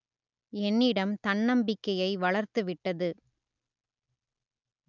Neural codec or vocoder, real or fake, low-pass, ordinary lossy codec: none; real; 7.2 kHz; none